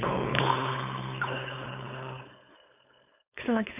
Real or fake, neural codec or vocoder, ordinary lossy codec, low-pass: fake; codec, 16 kHz, 4.8 kbps, FACodec; none; 3.6 kHz